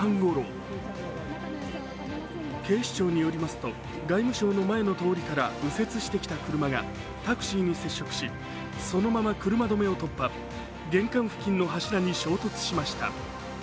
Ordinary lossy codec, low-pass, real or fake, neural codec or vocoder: none; none; real; none